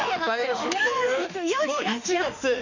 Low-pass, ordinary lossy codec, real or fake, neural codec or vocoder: 7.2 kHz; none; fake; autoencoder, 48 kHz, 32 numbers a frame, DAC-VAE, trained on Japanese speech